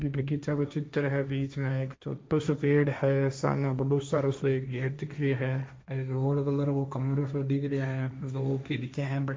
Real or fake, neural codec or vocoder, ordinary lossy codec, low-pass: fake; codec, 16 kHz, 1.1 kbps, Voila-Tokenizer; none; none